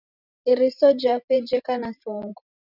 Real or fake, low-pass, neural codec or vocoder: fake; 5.4 kHz; vocoder, 44.1 kHz, 128 mel bands every 512 samples, BigVGAN v2